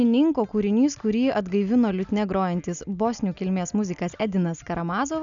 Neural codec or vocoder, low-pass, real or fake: none; 7.2 kHz; real